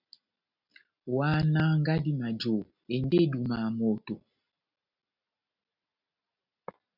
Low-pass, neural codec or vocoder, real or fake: 5.4 kHz; none; real